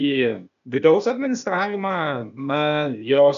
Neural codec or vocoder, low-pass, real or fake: codec, 16 kHz, 0.8 kbps, ZipCodec; 7.2 kHz; fake